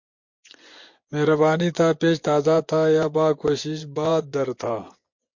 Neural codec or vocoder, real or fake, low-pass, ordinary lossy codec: vocoder, 24 kHz, 100 mel bands, Vocos; fake; 7.2 kHz; MP3, 48 kbps